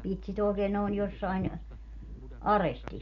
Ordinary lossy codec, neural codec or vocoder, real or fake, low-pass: none; none; real; 7.2 kHz